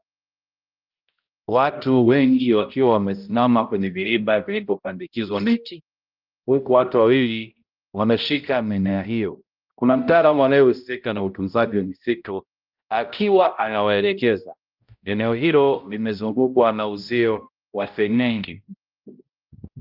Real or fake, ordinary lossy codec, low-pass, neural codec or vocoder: fake; Opus, 24 kbps; 5.4 kHz; codec, 16 kHz, 0.5 kbps, X-Codec, HuBERT features, trained on balanced general audio